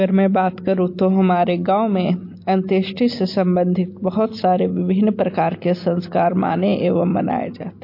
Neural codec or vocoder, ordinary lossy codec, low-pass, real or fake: none; MP3, 32 kbps; 5.4 kHz; real